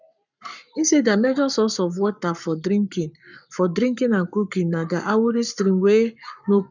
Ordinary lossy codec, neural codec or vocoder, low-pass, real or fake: none; codec, 44.1 kHz, 7.8 kbps, Pupu-Codec; 7.2 kHz; fake